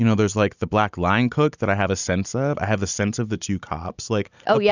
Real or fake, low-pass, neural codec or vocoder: real; 7.2 kHz; none